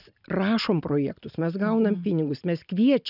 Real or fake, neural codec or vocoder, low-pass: real; none; 5.4 kHz